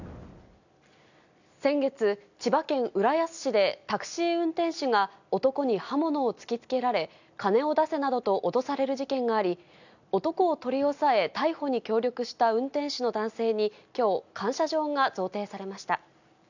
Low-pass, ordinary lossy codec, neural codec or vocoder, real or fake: 7.2 kHz; none; none; real